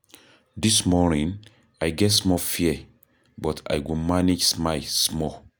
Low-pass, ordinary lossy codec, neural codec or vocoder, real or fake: none; none; none; real